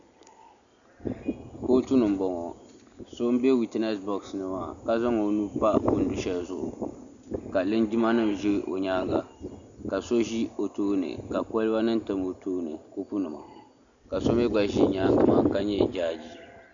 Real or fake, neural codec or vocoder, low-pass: real; none; 7.2 kHz